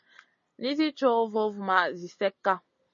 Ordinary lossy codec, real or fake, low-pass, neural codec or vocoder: MP3, 32 kbps; real; 7.2 kHz; none